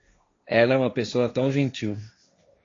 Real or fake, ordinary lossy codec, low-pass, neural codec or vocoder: fake; AAC, 32 kbps; 7.2 kHz; codec, 16 kHz, 1.1 kbps, Voila-Tokenizer